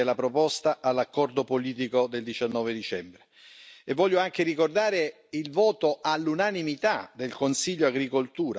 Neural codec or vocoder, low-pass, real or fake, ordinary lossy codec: none; none; real; none